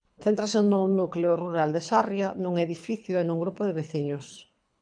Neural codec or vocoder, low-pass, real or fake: codec, 24 kHz, 3 kbps, HILCodec; 9.9 kHz; fake